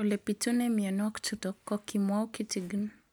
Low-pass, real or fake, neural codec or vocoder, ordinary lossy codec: none; real; none; none